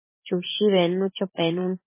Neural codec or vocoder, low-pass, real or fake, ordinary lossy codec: vocoder, 44.1 kHz, 128 mel bands every 512 samples, BigVGAN v2; 3.6 kHz; fake; MP3, 16 kbps